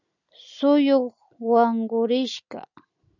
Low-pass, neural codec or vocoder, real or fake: 7.2 kHz; none; real